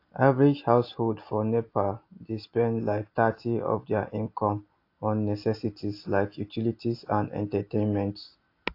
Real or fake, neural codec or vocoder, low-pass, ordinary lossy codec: real; none; 5.4 kHz; AAC, 32 kbps